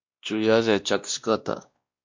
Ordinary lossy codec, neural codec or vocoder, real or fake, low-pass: MP3, 48 kbps; codec, 16 kHz, 1 kbps, X-Codec, WavLM features, trained on Multilingual LibriSpeech; fake; 7.2 kHz